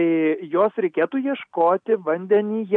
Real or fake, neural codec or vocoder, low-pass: real; none; 9.9 kHz